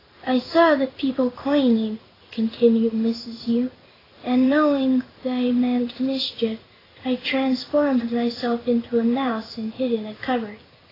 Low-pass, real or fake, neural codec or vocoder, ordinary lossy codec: 5.4 kHz; fake; codec, 16 kHz in and 24 kHz out, 1 kbps, XY-Tokenizer; AAC, 24 kbps